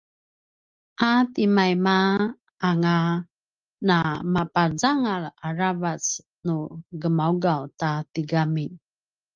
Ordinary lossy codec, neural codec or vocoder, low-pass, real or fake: Opus, 32 kbps; none; 7.2 kHz; real